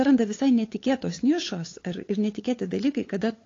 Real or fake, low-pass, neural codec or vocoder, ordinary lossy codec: fake; 7.2 kHz; codec, 16 kHz, 8 kbps, FunCodec, trained on LibriTTS, 25 frames a second; AAC, 32 kbps